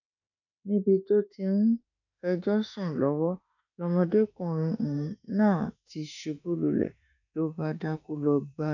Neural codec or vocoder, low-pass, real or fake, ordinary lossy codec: autoencoder, 48 kHz, 32 numbers a frame, DAC-VAE, trained on Japanese speech; 7.2 kHz; fake; none